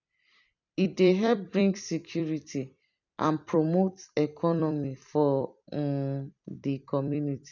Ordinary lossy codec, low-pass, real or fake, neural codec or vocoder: none; 7.2 kHz; fake; vocoder, 44.1 kHz, 128 mel bands every 256 samples, BigVGAN v2